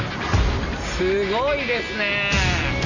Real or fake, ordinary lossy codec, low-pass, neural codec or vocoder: real; none; 7.2 kHz; none